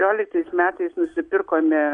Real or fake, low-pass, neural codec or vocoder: real; 9.9 kHz; none